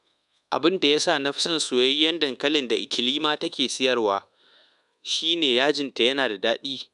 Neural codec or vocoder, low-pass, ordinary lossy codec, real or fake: codec, 24 kHz, 1.2 kbps, DualCodec; 10.8 kHz; none; fake